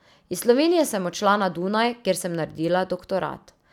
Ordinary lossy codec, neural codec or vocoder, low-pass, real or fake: none; none; 19.8 kHz; real